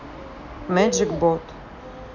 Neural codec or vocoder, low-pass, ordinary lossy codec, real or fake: none; 7.2 kHz; none; real